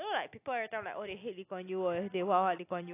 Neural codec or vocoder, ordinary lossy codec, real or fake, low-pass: none; AAC, 24 kbps; real; 3.6 kHz